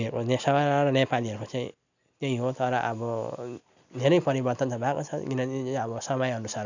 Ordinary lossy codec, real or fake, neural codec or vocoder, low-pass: none; real; none; 7.2 kHz